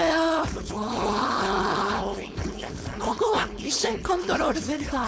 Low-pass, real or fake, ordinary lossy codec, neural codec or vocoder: none; fake; none; codec, 16 kHz, 4.8 kbps, FACodec